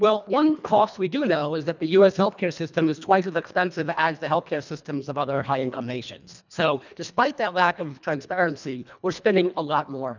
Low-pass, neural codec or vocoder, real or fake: 7.2 kHz; codec, 24 kHz, 1.5 kbps, HILCodec; fake